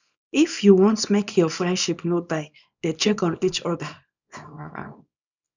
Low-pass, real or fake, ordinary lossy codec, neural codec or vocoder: 7.2 kHz; fake; none; codec, 24 kHz, 0.9 kbps, WavTokenizer, small release